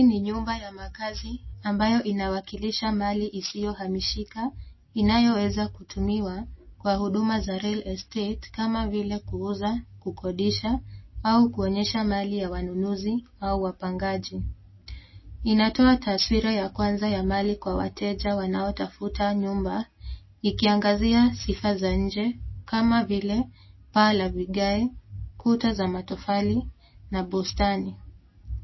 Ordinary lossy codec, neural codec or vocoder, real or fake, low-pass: MP3, 24 kbps; none; real; 7.2 kHz